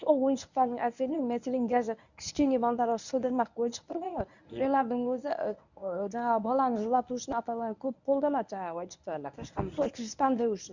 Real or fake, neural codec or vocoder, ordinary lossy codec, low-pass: fake; codec, 24 kHz, 0.9 kbps, WavTokenizer, medium speech release version 1; none; 7.2 kHz